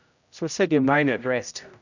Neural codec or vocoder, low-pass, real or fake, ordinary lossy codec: codec, 16 kHz, 0.5 kbps, X-Codec, HuBERT features, trained on general audio; 7.2 kHz; fake; none